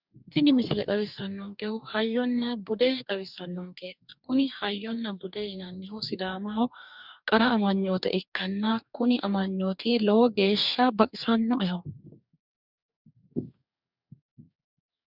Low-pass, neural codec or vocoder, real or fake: 5.4 kHz; codec, 44.1 kHz, 2.6 kbps, DAC; fake